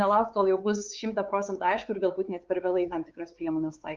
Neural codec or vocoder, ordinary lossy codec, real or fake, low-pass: codec, 16 kHz, 4 kbps, X-Codec, WavLM features, trained on Multilingual LibriSpeech; Opus, 32 kbps; fake; 7.2 kHz